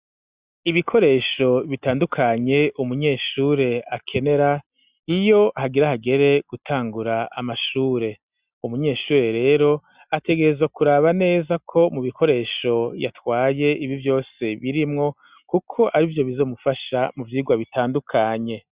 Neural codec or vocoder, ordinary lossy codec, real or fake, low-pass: none; Opus, 64 kbps; real; 3.6 kHz